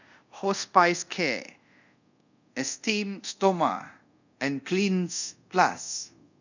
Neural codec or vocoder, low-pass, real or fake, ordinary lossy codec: codec, 24 kHz, 0.5 kbps, DualCodec; 7.2 kHz; fake; none